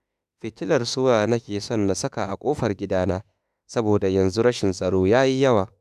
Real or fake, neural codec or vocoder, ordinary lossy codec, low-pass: fake; autoencoder, 48 kHz, 32 numbers a frame, DAC-VAE, trained on Japanese speech; none; 14.4 kHz